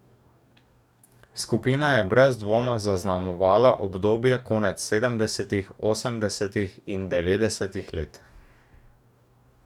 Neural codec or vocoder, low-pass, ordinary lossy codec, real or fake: codec, 44.1 kHz, 2.6 kbps, DAC; 19.8 kHz; none; fake